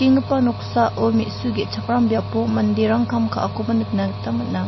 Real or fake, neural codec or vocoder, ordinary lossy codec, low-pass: real; none; MP3, 24 kbps; 7.2 kHz